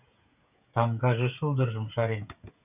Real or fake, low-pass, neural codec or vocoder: fake; 3.6 kHz; codec, 16 kHz, 16 kbps, FreqCodec, smaller model